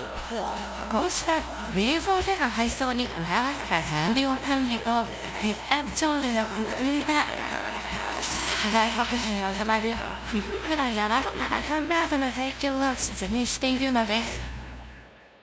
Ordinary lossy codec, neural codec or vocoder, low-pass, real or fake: none; codec, 16 kHz, 0.5 kbps, FunCodec, trained on LibriTTS, 25 frames a second; none; fake